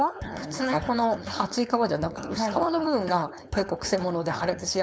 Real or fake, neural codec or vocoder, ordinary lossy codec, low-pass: fake; codec, 16 kHz, 4.8 kbps, FACodec; none; none